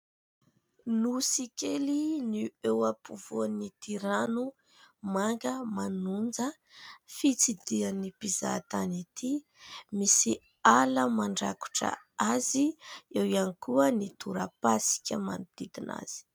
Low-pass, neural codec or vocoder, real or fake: 19.8 kHz; none; real